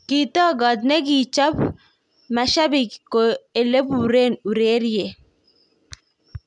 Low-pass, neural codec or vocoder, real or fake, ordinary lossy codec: 10.8 kHz; none; real; none